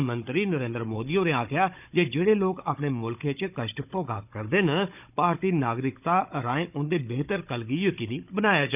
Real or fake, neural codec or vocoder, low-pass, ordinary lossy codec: fake; codec, 16 kHz, 16 kbps, FunCodec, trained on Chinese and English, 50 frames a second; 3.6 kHz; none